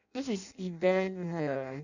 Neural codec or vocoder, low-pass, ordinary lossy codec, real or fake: codec, 16 kHz in and 24 kHz out, 0.6 kbps, FireRedTTS-2 codec; 7.2 kHz; none; fake